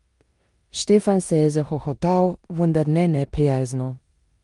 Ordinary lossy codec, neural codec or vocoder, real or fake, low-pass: Opus, 32 kbps; codec, 16 kHz in and 24 kHz out, 0.9 kbps, LongCat-Audio-Codec, four codebook decoder; fake; 10.8 kHz